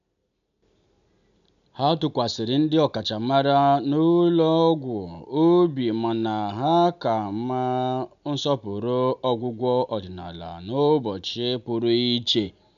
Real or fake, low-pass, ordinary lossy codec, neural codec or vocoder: real; 7.2 kHz; MP3, 96 kbps; none